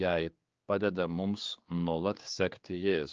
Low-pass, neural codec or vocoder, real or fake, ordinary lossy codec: 7.2 kHz; codec, 16 kHz, 4 kbps, X-Codec, HuBERT features, trained on general audio; fake; Opus, 24 kbps